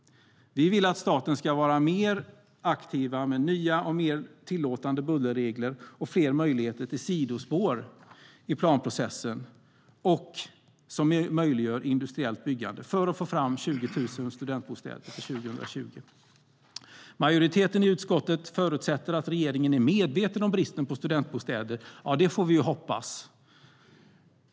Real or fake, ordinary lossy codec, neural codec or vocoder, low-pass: real; none; none; none